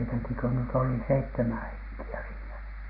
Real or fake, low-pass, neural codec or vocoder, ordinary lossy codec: real; 5.4 kHz; none; none